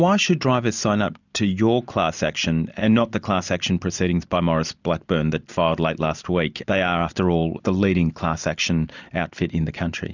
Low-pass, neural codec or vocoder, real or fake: 7.2 kHz; none; real